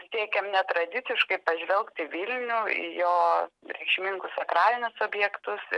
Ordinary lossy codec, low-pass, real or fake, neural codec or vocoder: MP3, 96 kbps; 10.8 kHz; real; none